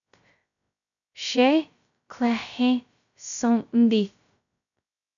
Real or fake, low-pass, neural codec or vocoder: fake; 7.2 kHz; codec, 16 kHz, 0.2 kbps, FocalCodec